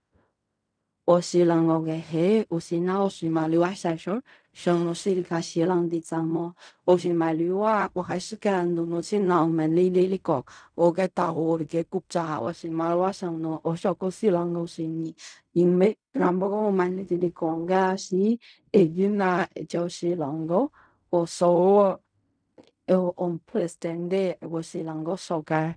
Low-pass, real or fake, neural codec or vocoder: 9.9 kHz; fake; codec, 16 kHz in and 24 kHz out, 0.4 kbps, LongCat-Audio-Codec, fine tuned four codebook decoder